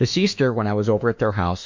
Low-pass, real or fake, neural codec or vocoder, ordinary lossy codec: 7.2 kHz; fake; autoencoder, 48 kHz, 32 numbers a frame, DAC-VAE, trained on Japanese speech; MP3, 48 kbps